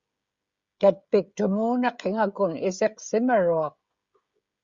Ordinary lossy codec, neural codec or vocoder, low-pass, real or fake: Opus, 64 kbps; codec, 16 kHz, 16 kbps, FreqCodec, smaller model; 7.2 kHz; fake